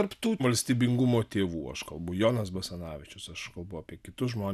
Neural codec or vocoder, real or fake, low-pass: none; real; 14.4 kHz